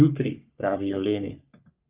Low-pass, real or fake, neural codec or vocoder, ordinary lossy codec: 3.6 kHz; fake; codec, 44.1 kHz, 3.4 kbps, Pupu-Codec; Opus, 24 kbps